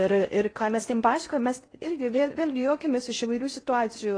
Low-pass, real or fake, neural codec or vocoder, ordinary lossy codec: 9.9 kHz; fake; codec, 16 kHz in and 24 kHz out, 0.6 kbps, FocalCodec, streaming, 4096 codes; AAC, 32 kbps